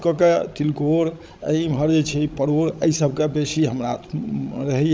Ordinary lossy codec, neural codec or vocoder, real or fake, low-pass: none; codec, 16 kHz, 16 kbps, FreqCodec, larger model; fake; none